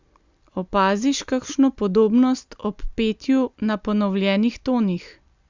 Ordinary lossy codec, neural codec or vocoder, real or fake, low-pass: Opus, 64 kbps; none; real; 7.2 kHz